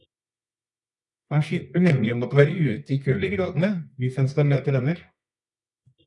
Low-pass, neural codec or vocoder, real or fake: 10.8 kHz; codec, 24 kHz, 0.9 kbps, WavTokenizer, medium music audio release; fake